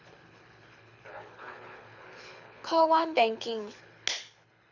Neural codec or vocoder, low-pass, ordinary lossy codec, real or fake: codec, 24 kHz, 6 kbps, HILCodec; 7.2 kHz; none; fake